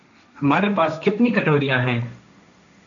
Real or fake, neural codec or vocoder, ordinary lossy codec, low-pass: fake; codec, 16 kHz, 1.1 kbps, Voila-Tokenizer; MP3, 96 kbps; 7.2 kHz